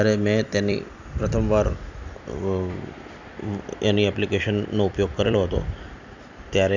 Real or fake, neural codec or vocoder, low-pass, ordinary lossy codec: fake; vocoder, 44.1 kHz, 128 mel bands every 256 samples, BigVGAN v2; 7.2 kHz; none